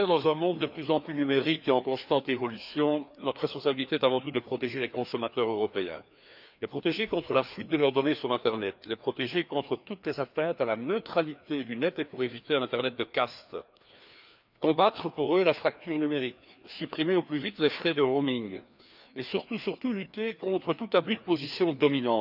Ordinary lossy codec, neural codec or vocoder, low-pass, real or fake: none; codec, 16 kHz, 2 kbps, FreqCodec, larger model; 5.4 kHz; fake